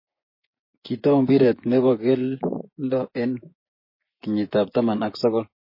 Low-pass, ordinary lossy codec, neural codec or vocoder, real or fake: 5.4 kHz; MP3, 24 kbps; vocoder, 24 kHz, 100 mel bands, Vocos; fake